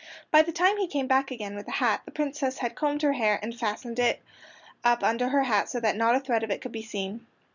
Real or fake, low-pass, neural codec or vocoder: real; 7.2 kHz; none